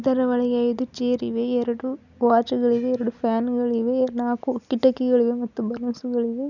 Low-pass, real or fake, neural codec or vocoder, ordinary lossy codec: 7.2 kHz; real; none; none